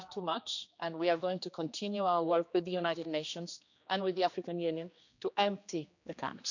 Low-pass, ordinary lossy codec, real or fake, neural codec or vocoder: 7.2 kHz; none; fake; codec, 16 kHz, 2 kbps, X-Codec, HuBERT features, trained on general audio